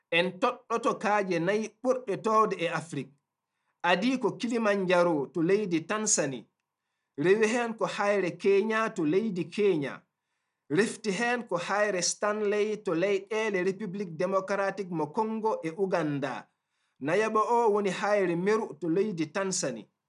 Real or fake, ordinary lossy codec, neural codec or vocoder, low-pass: real; none; none; 10.8 kHz